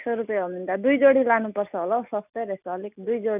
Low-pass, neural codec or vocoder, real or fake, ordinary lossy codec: 3.6 kHz; none; real; none